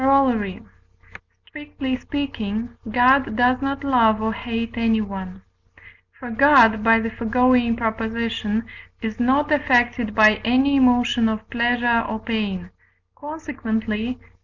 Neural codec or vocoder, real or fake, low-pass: none; real; 7.2 kHz